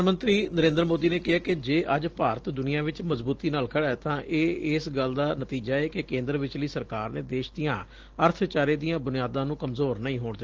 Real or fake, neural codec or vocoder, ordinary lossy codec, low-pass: real; none; Opus, 16 kbps; 7.2 kHz